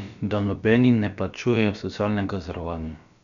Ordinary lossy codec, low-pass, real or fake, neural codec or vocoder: none; 7.2 kHz; fake; codec, 16 kHz, about 1 kbps, DyCAST, with the encoder's durations